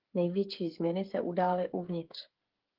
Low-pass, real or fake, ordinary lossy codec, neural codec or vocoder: 5.4 kHz; fake; Opus, 16 kbps; codec, 16 kHz, 8 kbps, FreqCodec, smaller model